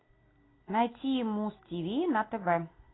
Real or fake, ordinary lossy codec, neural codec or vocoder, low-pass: real; AAC, 16 kbps; none; 7.2 kHz